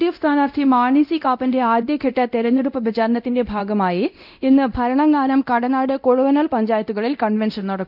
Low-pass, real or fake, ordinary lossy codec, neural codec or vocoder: 5.4 kHz; fake; none; codec, 24 kHz, 0.9 kbps, DualCodec